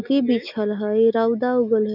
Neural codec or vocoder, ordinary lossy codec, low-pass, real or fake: none; none; 5.4 kHz; real